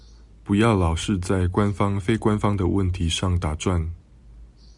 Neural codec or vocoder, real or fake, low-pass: none; real; 10.8 kHz